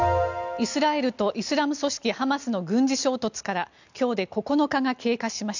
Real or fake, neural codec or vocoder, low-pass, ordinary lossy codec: real; none; 7.2 kHz; none